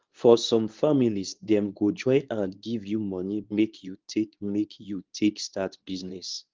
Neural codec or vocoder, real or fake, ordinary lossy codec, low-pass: codec, 24 kHz, 0.9 kbps, WavTokenizer, medium speech release version 2; fake; Opus, 24 kbps; 7.2 kHz